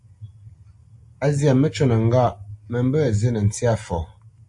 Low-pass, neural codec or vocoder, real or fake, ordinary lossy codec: 10.8 kHz; none; real; AAC, 64 kbps